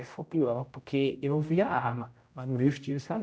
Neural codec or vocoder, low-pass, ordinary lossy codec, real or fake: codec, 16 kHz, 0.5 kbps, X-Codec, HuBERT features, trained on general audio; none; none; fake